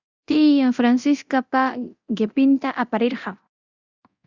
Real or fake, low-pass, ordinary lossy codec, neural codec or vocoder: fake; 7.2 kHz; Opus, 64 kbps; codec, 24 kHz, 0.9 kbps, DualCodec